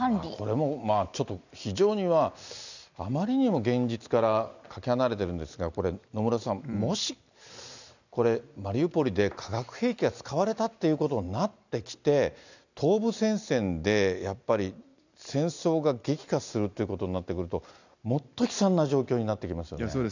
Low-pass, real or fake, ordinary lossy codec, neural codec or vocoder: 7.2 kHz; real; none; none